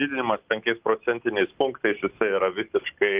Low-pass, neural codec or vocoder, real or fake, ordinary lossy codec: 3.6 kHz; none; real; Opus, 24 kbps